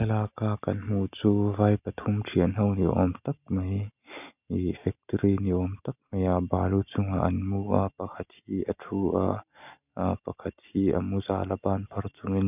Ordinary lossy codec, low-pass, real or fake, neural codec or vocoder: none; 3.6 kHz; real; none